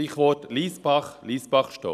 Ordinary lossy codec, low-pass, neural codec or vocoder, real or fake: none; 14.4 kHz; none; real